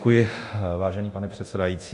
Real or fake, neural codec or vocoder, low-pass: fake; codec, 24 kHz, 0.9 kbps, DualCodec; 10.8 kHz